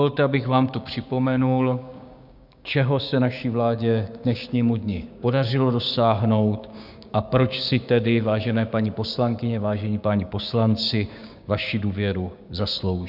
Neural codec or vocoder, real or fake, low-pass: codec, 16 kHz, 6 kbps, DAC; fake; 5.4 kHz